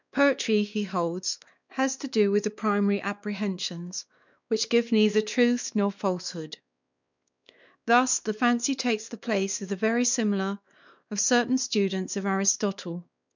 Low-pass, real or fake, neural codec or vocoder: 7.2 kHz; fake; codec, 16 kHz, 2 kbps, X-Codec, WavLM features, trained on Multilingual LibriSpeech